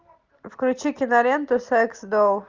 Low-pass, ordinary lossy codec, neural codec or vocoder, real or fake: 7.2 kHz; Opus, 24 kbps; none; real